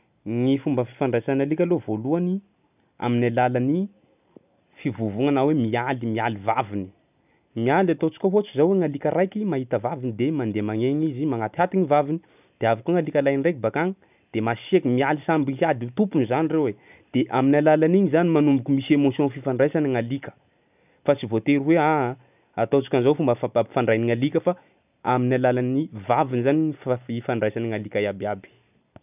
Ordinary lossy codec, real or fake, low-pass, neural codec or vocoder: none; real; 3.6 kHz; none